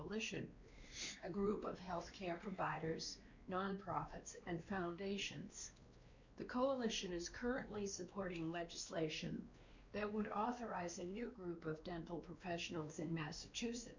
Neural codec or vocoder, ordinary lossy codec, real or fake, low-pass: codec, 16 kHz, 2 kbps, X-Codec, WavLM features, trained on Multilingual LibriSpeech; Opus, 64 kbps; fake; 7.2 kHz